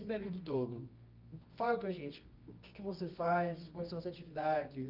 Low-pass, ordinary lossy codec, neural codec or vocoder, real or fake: 5.4 kHz; Opus, 24 kbps; codec, 24 kHz, 0.9 kbps, WavTokenizer, medium music audio release; fake